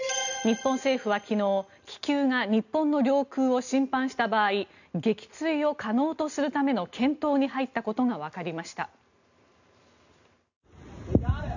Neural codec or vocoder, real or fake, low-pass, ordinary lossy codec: none; real; 7.2 kHz; none